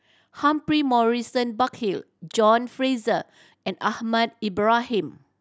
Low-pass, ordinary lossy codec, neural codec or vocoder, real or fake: none; none; none; real